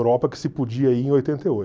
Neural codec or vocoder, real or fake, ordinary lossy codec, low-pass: none; real; none; none